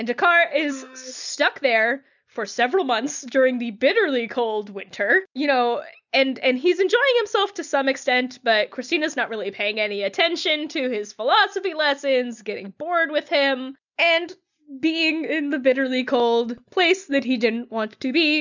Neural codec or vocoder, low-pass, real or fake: none; 7.2 kHz; real